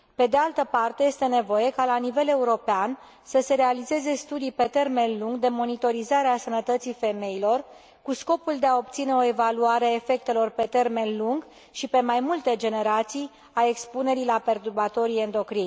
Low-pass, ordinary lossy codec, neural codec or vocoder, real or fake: none; none; none; real